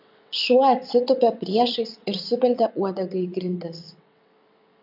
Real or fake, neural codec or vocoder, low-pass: fake; vocoder, 22.05 kHz, 80 mel bands, WaveNeXt; 5.4 kHz